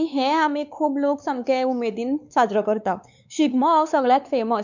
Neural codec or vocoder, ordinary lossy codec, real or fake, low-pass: codec, 16 kHz, 4 kbps, X-Codec, WavLM features, trained on Multilingual LibriSpeech; none; fake; 7.2 kHz